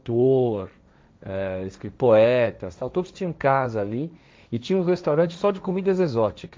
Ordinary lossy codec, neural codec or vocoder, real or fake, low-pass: none; codec, 16 kHz, 1.1 kbps, Voila-Tokenizer; fake; none